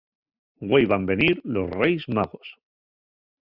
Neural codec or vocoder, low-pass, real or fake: none; 5.4 kHz; real